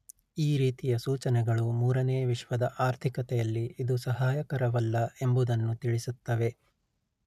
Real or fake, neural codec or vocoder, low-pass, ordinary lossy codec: real; none; 14.4 kHz; none